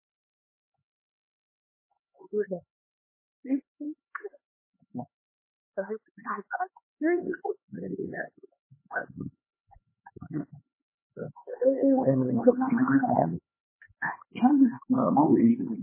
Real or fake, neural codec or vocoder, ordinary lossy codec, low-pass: fake; codec, 16 kHz, 2 kbps, X-Codec, HuBERT features, trained on LibriSpeech; MP3, 16 kbps; 3.6 kHz